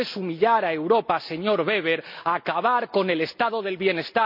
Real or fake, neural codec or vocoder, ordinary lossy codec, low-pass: real; none; none; 5.4 kHz